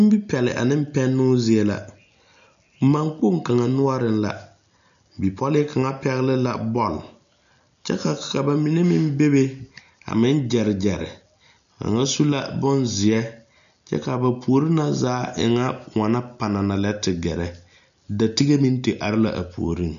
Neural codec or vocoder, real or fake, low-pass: none; real; 7.2 kHz